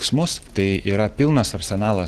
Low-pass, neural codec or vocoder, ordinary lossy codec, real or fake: 14.4 kHz; none; Opus, 16 kbps; real